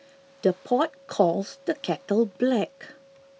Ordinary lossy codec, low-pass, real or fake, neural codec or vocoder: none; none; real; none